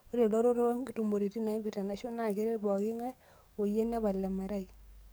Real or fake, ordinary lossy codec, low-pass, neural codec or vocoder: fake; none; none; codec, 44.1 kHz, 7.8 kbps, DAC